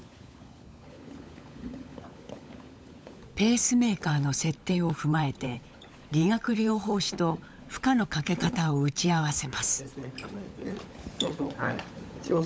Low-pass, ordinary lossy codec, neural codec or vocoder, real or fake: none; none; codec, 16 kHz, 16 kbps, FunCodec, trained on LibriTTS, 50 frames a second; fake